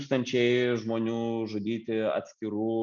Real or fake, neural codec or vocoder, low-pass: real; none; 7.2 kHz